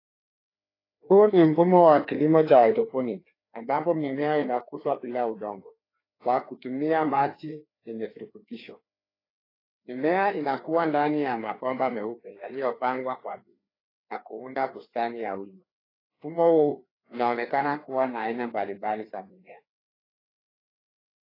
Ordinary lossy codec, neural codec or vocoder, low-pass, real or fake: AAC, 24 kbps; codec, 16 kHz, 2 kbps, FreqCodec, larger model; 5.4 kHz; fake